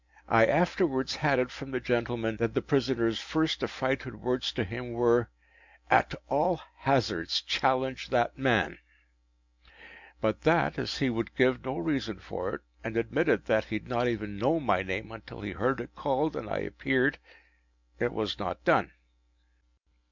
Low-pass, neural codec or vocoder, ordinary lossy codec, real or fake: 7.2 kHz; none; MP3, 64 kbps; real